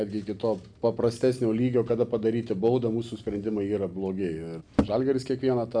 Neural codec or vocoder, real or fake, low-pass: none; real; 9.9 kHz